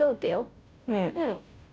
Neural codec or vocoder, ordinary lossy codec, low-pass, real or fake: codec, 16 kHz, 0.5 kbps, FunCodec, trained on Chinese and English, 25 frames a second; none; none; fake